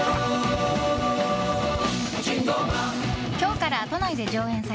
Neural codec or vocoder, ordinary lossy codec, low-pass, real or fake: none; none; none; real